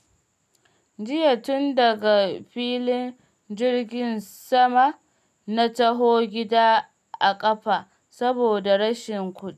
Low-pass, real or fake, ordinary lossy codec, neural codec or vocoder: 14.4 kHz; real; none; none